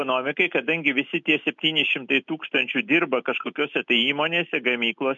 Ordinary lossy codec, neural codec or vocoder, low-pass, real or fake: MP3, 64 kbps; none; 7.2 kHz; real